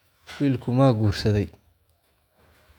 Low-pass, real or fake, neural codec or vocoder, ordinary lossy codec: 19.8 kHz; fake; autoencoder, 48 kHz, 128 numbers a frame, DAC-VAE, trained on Japanese speech; none